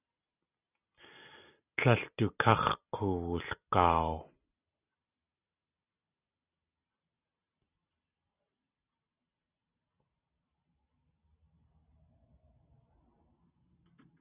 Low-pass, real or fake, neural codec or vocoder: 3.6 kHz; real; none